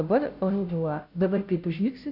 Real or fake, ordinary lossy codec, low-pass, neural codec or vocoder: fake; AAC, 48 kbps; 5.4 kHz; codec, 16 kHz, 0.5 kbps, FunCodec, trained on Chinese and English, 25 frames a second